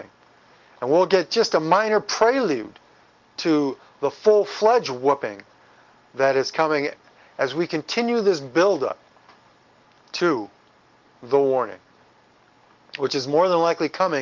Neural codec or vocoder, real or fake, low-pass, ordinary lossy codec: none; real; 7.2 kHz; Opus, 32 kbps